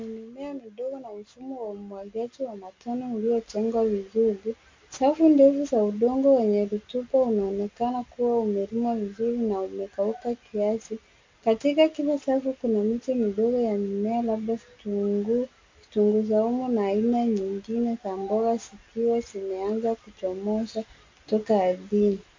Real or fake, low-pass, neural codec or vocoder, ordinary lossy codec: real; 7.2 kHz; none; MP3, 48 kbps